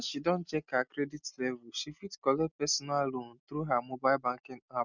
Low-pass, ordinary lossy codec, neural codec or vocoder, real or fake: 7.2 kHz; none; none; real